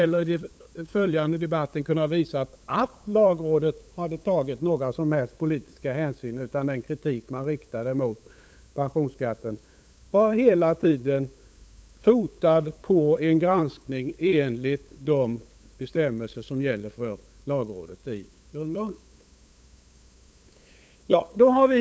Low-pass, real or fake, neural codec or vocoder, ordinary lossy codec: none; fake; codec, 16 kHz, 8 kbps, FunCodec, trained on LibriTTS, 25 frames a second; none